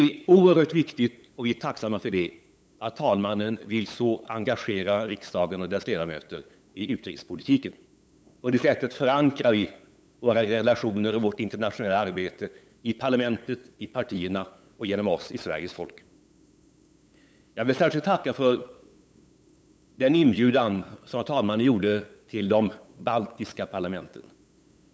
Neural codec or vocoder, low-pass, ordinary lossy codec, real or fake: codec, 16 kHz, 8 kbps, FunCodec, trained on LibriTTS, 25 frames a second; none; none; fake